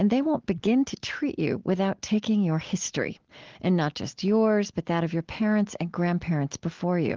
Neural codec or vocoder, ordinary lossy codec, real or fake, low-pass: none; Opus, 16 kbps; real; 7.2 kHz